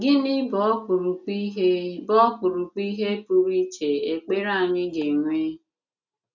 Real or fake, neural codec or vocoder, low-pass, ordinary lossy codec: real; none; 7.2 kHz; none